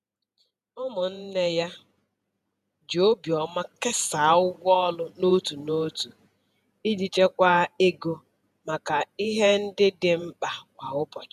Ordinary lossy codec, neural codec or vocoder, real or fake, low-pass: none; vocoder, 48 kHz, 128 mel bands, Vocos; fake; 14.4 kHz